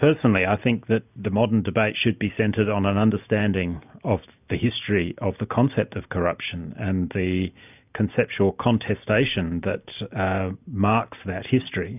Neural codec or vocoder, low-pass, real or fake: none; 3.6 kHz; real